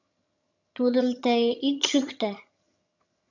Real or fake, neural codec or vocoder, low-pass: fake; vocoder, 22.05 kHz, 80 mel bands, HiFi-GAN; 7.2 kHz